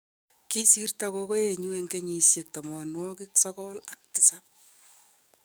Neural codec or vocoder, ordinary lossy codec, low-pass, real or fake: codec, 44.1 kHz, 7.8 kbps, DAC; none; none; fake